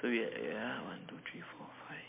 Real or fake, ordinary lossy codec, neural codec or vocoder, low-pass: real; MP3, 24 kbps; none; 3.6 kHz